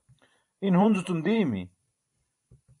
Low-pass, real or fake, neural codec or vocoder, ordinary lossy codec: 10.8 kHz; fake; vocoder, 44.1 kHz, 128 mel bands every 512 samples, BigVGAN v2; AAC, 64 kbps